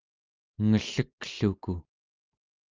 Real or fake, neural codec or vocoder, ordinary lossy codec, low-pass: real; none; Opus, 24 kbps; 7.2 kHz